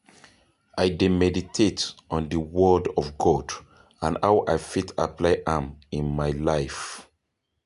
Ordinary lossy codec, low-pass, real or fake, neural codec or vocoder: none; 10.8 kHz; real; none